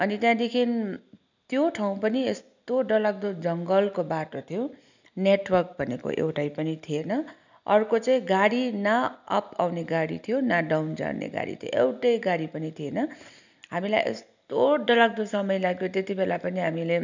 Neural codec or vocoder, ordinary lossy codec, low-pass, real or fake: none; none; 7.2 kHz; real